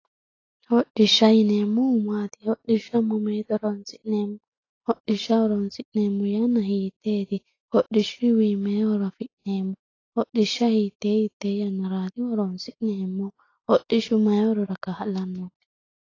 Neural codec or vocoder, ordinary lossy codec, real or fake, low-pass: none; AAC, 32 kbps; real; 7.2 kHz